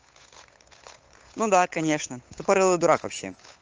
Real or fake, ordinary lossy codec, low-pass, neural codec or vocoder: fake; Opus, 24 kbps; 7.2 kHz; codec, 16 kHz, 8 kbps, FunCodec, trained on Chinese and English, 25 frames a second